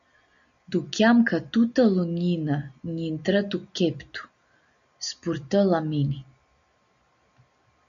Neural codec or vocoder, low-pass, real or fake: none; 7.2 kHz; real